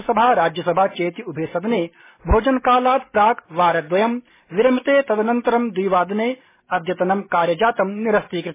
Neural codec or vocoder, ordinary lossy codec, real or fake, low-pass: none; MP3, 16 kbps; real; 3.6 kHz